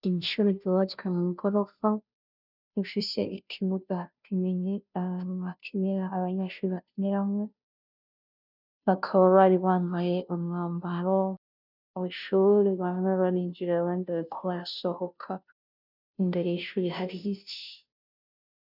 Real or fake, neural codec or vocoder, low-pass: fake; codec, 16 kHz, 0.5 kbps, FunCodec, trained on Chinese and English, 25 frames a second; 5.4 kHz